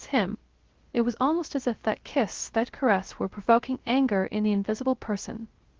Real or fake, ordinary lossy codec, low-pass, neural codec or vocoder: fake; Opus, 16 kbps; 7.2 kHz; codec, 16 kHz, 0.3 kbps, FocalCodec